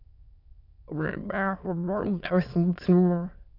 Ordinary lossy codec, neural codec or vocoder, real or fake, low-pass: AAC, 48 kbps; autoencoder, 22.05 kHz, a latent of 192 numbers a frame, VITS, trained on many speakers; fake; 5.4 kHz